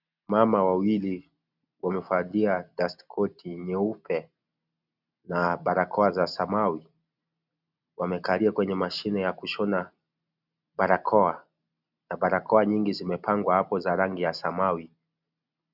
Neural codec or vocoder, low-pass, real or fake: none; 5.4 kHz; real